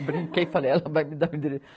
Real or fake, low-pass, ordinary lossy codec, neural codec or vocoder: real; none; none; none